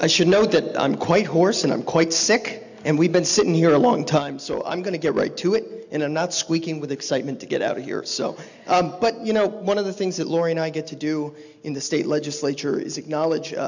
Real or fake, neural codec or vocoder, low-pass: real; none; 7.2 kHz